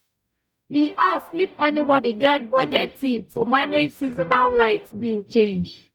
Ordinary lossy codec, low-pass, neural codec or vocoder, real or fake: none; 19.8 kHz; codec, 44.1 kHz, 0.9 kbps, DAC; fake